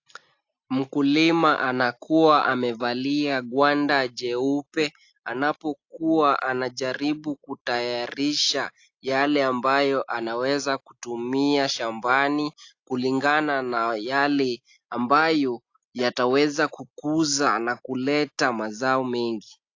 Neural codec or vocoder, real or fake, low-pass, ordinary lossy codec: none; real; 7.2 kHz; AAC, 48 kbps